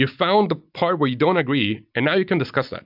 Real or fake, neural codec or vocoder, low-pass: real; none; 5.4 kHz